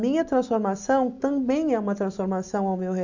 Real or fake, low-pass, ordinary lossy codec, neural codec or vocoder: real; 7.2 kHz; none; none